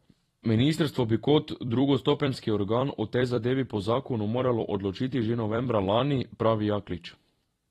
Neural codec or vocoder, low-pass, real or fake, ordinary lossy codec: vocoder, 44.1 kHz, 128 mel bands every 256 samples, BigVGAN v2; 19.8 kHz; fake; AAC, 32 kbps